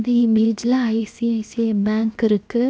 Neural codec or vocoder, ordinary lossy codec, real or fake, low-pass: codec, 16 kHz, 0.7 kbps, FocalCodec; none; fake; none